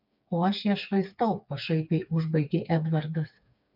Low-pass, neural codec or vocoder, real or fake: 5.4 kHz; codec, 16 kHz, 4 kbps, FreqCodec, smaller model; fake